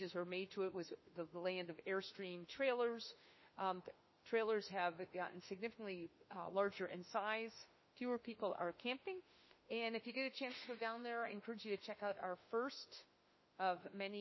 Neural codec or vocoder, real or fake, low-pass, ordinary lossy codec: autoencoder, 48 kHz, 32 numbers a frame, DAC-VAE, trained on Japanese speech; fake; 7.2 kHz; MP3, 24 kbps